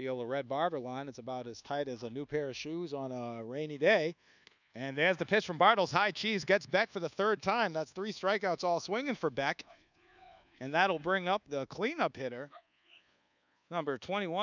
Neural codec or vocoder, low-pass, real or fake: codec, 24 kHz, 1.2 kbps, DualCodec; 7.2 kHz; fake